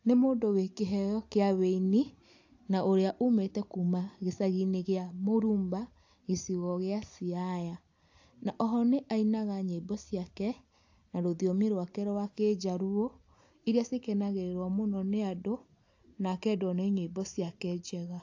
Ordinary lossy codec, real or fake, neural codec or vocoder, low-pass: AAC, 48 kbps; real; none; 7.2 kHz